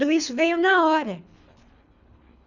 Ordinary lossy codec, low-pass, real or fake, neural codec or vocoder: none; 7.2 kHz; fake; codec, 24 kHz, 3 kbps, HILCodec